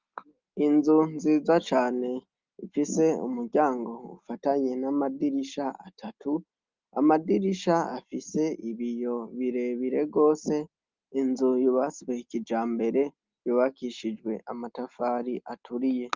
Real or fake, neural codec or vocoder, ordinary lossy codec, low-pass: real; none; Opus, 24 kbps; 7.2 kHz